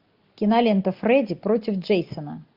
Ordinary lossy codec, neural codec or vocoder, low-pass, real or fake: Opus, 24 kbps; none; 5.4 kHz; real